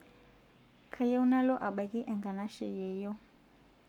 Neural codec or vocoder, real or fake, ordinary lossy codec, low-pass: codec, 44.1 kHz, 7.8 kbps, Pupu-Codec; fake; none; 19.8 kHz